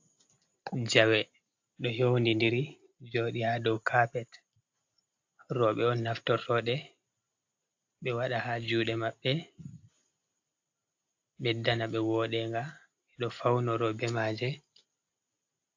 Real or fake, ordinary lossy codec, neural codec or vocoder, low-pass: real; AAC, 48 kbps; none; 7.2 kHz